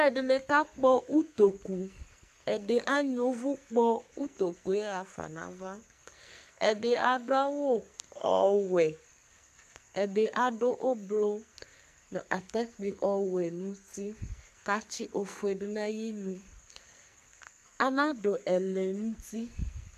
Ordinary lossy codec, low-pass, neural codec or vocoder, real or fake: MP3, 96 kbps; 14.4 kHz; codec, 44.1 kHz, 2.6 kbps, SNAC; fake